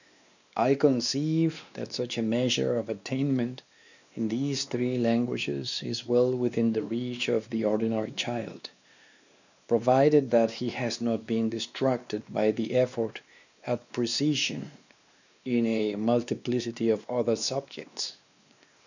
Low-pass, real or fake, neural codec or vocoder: 7.2 kHz; fake; codec, 16 kHz, 2 kbps, X-Codec, WavLM features, trained on Multilingual LibriSpeech